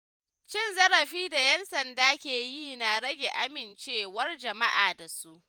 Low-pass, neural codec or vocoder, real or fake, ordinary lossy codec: none; vocoder, 48 kHz, 128 mel bands, Vocos; fake; none